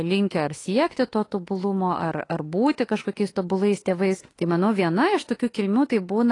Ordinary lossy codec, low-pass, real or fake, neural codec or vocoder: AAC, 32 kbps; 10.8 kHz; fake; autoencoder, 48 kHz, 32 numbers a frame, DAC-VAE, trained on Japanese speech